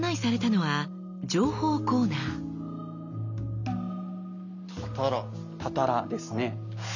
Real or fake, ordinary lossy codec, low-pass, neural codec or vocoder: real; none; 7.2 kHz; none